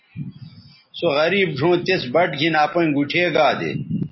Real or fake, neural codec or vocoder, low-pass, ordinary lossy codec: real; none; 7.2 kHz; MP3, 24 kbps